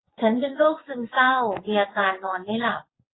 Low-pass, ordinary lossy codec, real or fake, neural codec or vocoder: 7.2 kHz; AAC, 16 kbps; fake; vocoder, 22.05 kHz, 80 mel bands, WaveNeXt